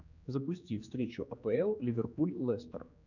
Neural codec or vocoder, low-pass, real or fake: codec, 16 kHz, 2 kbps, X-Codec, HuBERT features, trained on general audio; 7.2 kHz; fake